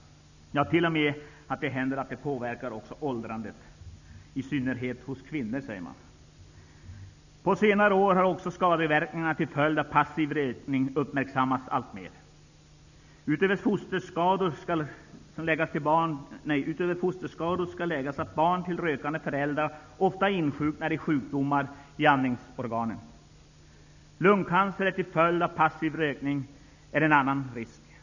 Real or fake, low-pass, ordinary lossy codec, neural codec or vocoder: real; 7.2 kHz; none; none